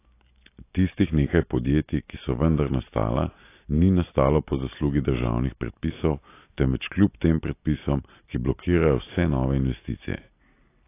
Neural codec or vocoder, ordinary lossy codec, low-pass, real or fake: none; AAC, 24 kbps; 3.6 kHz; real